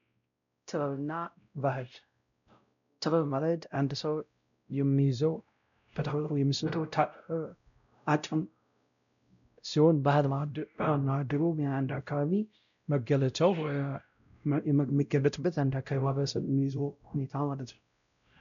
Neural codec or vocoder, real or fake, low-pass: codec, 16 kHz, 0.5 kbps, X-Codec, WavLM features, trained on Multilingual LibriSpeech; fake; 7.2 kHz